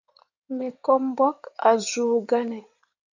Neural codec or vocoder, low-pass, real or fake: codec, 16 kHz in and 24 kHz out, 2.2 kbps, FireRedTTS-2 codec; 7.2 kHz; fake